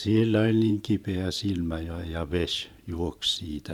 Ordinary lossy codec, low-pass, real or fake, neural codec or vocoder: none; 19.8 kHz; fake; vocoder, 44.1 kHz, 128 mel bands every 512 samples, BigVGAN v2